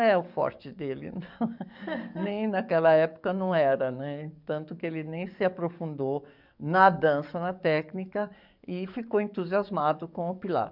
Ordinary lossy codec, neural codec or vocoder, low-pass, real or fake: none; codec, 44.1 kHz, 7.8 kbps, DAC; 5.4 kHz; fake